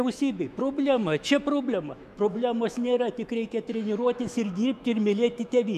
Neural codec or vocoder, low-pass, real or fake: autoencoder, 48 kHz, 128 numbers a frame, DAC-VAE, trained on Japanese speech; 14.4 kHz; fake